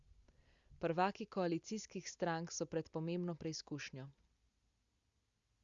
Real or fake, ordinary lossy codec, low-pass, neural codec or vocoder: real; Opus, 64 kbps; 7.2 kHz; none